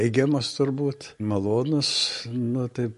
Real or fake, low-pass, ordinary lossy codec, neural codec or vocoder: real; 14.4 kHz; MP3, 48 kbps; none